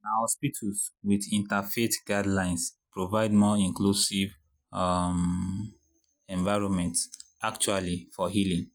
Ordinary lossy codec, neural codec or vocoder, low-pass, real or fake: none; none; none; real